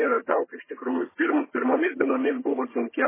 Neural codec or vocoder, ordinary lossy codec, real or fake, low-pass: vocoder, 22.05 kHz, 80 mel bands, HiFi-GAN; MP3, 16 kbps; fake; 3.6 kHz